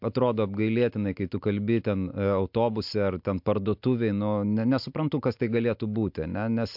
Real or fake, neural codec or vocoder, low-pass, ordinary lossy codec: real; none; 5.4 kHz; MP3, 48 kbps